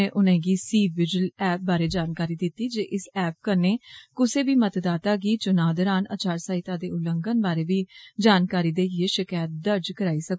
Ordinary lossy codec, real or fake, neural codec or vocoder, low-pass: none; real; none; none